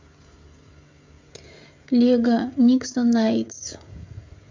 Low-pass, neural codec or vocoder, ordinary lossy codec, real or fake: 7.2 kHz; codec, 16 kHz, 16 kbps, FreqCodec, smaller model; MP3, 48 kbps; fake